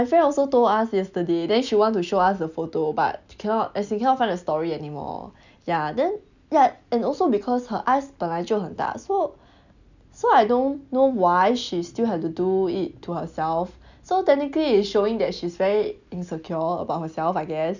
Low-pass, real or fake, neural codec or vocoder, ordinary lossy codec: 7.2 kHz; real; none; none